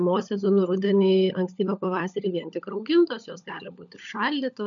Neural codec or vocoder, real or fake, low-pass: codec, 16 kHz, 16 kbps, FunCodec, trained on LibriTTS, 50 frames a second; fake; 7.2 kHz